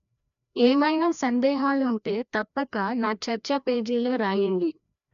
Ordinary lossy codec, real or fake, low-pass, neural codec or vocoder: MP3, 96 kbps; fake; 7.2 kHz; codec, 16 kHz, 1 kbps, FreqCodec, larger model